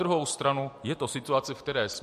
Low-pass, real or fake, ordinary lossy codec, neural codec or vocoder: 14.4 kHz; real; MP3, 64 kbps; none